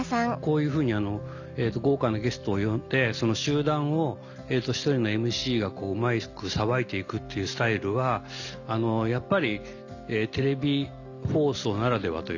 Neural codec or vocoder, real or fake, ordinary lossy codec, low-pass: none; real; none; 7.2 kHz